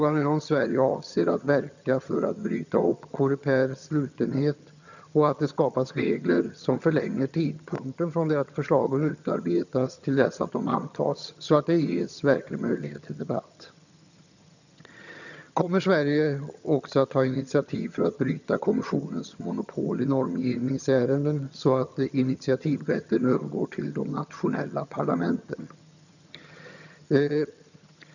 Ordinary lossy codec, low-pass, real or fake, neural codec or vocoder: none; 7.2 kHz; fake; vocoder, 22.05 kHz, 80 mel bands, HiFi-GAN